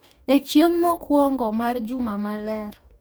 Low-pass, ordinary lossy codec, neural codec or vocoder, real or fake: none; none; codec, 44.1 kHz, 2.6 kbps, DAC; fake